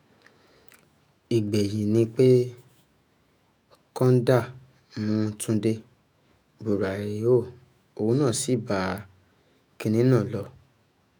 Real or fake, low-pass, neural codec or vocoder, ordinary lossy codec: fake; none; autoencoder, 48 kHz, 128 numbers a frame, DAC-VAE, trained on Japanese speech; none